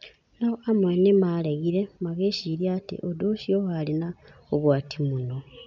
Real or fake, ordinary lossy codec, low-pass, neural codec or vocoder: real; none; 7.2 kHz; none